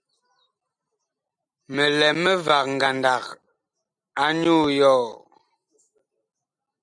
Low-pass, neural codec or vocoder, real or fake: 9.9 kHz; none; real